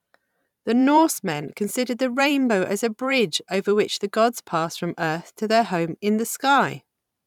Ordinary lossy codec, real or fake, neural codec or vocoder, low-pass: none; fake; vocoder, 44.1 kHz, 128 mel bands every 512 samples, BigVGAN v2; 19.8 kHz